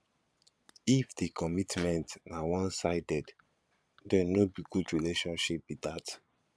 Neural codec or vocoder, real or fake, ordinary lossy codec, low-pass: vocoder, 22.05 kHz, 80 mel bands, Vocos; fake; none; none